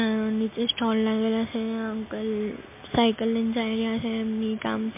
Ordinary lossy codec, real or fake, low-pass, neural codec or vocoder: MP3, 24 kbps; real; 3.6 kHz; none